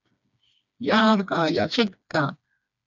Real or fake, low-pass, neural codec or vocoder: fake; 7.2 kHz; codec, 16 kHz, 2 kbps, FreqCodec, smaller model